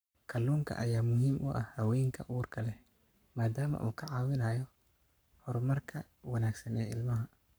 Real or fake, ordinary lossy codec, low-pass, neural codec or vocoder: fake; none; none; codec, 44.1 kHz, 7.8 kbps, Pupu-Codec